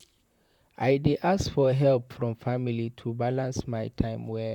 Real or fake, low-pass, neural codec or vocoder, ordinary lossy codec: fake; 19.8 kHz; vocoder, 44.1 kHz, 128 mel bands, Pupu-Vocoder; none